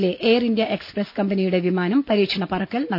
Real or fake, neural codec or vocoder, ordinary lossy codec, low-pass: real; none; none; 5.4 kHz